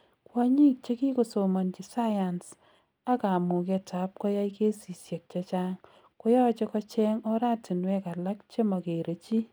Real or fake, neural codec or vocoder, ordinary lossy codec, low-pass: real; none; none; none